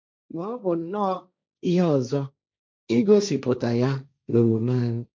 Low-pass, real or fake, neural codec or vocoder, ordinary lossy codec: none; fake; codec, 16 kHz, 1.1 kbps, Voila-Tokenizer; none